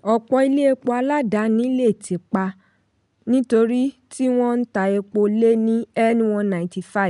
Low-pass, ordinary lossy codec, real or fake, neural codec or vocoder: 10.8 kHz; none; real; none